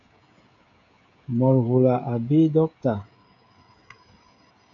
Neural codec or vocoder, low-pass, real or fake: codec, 16 kHz, 16 kbps, FreqCodec, smaller model; 7.2 kHz; fake